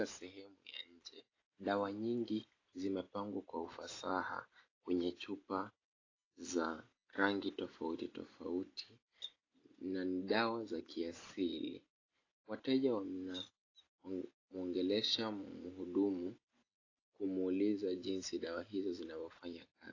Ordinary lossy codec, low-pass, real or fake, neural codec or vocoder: AAC, 32 kbps; 7.2 kHz; real; none